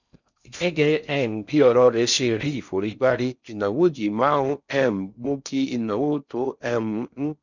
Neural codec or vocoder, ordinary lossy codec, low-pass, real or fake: codec, 16 kHz in and 24 kHz out, 0.6 kbps, FocalCodec, streaming, 4096 codes; none; 7.2 kHz; fake